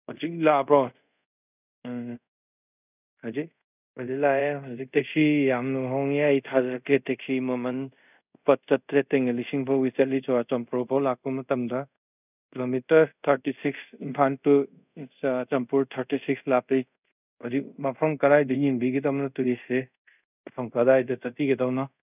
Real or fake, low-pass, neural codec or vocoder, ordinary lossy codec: fake; 3.6 kHz; codec, 24 kHz, 0.5 kbps, DualCodec; none